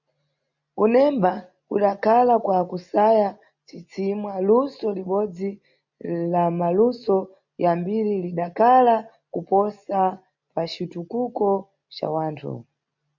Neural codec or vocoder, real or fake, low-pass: none; real; 7.2 kHz